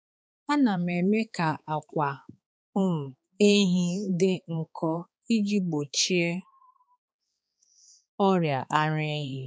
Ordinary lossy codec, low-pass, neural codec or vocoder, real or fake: none; none; codec, 16 kHz, 4 kbps, X-Codec, HuBERT features, trained on balanced general audio; fake